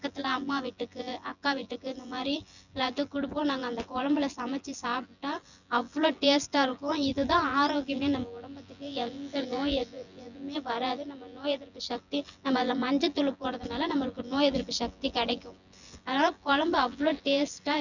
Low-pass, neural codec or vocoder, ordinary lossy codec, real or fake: 7.2 kHz; vocoder, 24 kHz, 100 mel bands, Vocos; none; fake